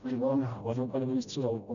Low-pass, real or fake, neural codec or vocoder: 7.2 kHz; fake; codec, 16 kHz, 0.5 kbps, FreqCodec, smaller model